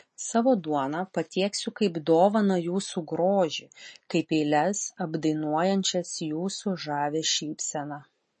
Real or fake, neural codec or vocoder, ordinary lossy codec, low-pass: real; none; MP3, 32 kbps; 10.8 kHz